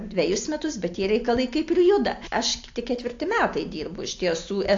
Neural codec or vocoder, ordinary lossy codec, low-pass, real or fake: none; AAC, 48 kbps; 7.2 kHz; real